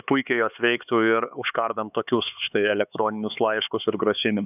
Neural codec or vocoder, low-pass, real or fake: codec, 16 kHz, 4 kbps, X-Codec, HuBERT features, trained on LibriSpeech; 3.6 kHz; fake